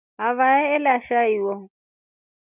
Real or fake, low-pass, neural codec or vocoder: real; 3.6 kHz; none